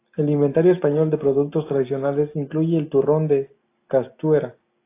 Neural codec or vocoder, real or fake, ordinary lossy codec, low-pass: none; real; AAC, 24 kbps; 3.6 kHz